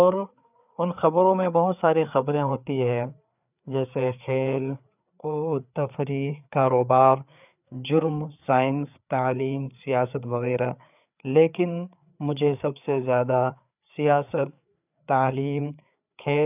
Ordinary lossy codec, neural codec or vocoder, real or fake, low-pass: none; codec, 16 kHz, 4 kbps, FreqCodec, larger model; fake; 3.6 kHz